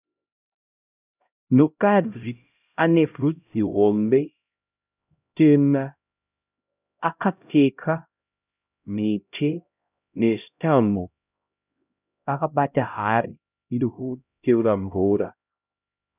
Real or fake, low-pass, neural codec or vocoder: fake; 3.6 kHz; codec, 16 kHz, 0.5 kbps, X-Codec, HuBERT features, trained on LibriSpeech